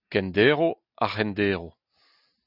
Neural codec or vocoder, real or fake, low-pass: none; real; 5.4 kHz